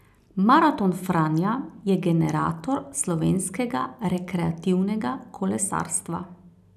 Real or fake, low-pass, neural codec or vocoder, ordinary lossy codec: real; 14.4 kHz; none; none